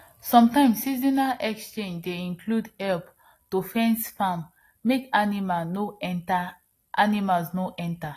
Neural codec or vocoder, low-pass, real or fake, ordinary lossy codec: none; 14.4 kHz; real; AAC, 48 kbps